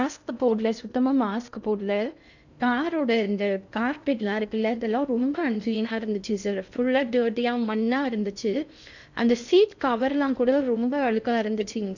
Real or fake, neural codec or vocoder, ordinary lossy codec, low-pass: fake; codec, 16 kHz in and 24 kHz out, 0.6 kbps, FocalCodec, streaming, 2048 codes; none; 7.2 kHz